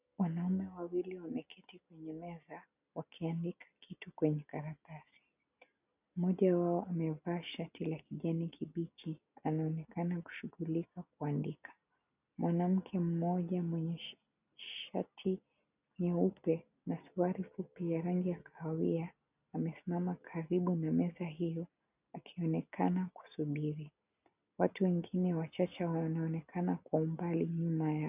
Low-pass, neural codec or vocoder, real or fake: 3.6 kHz; none; real